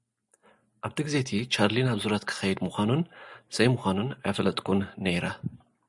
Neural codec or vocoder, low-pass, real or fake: none; 10.8 kHz; real